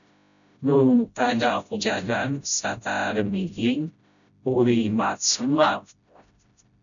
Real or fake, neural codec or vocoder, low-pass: fake; codec, 16 kHz, 0.5 kbps, FreqCodec, smaller model; 7.2 kHz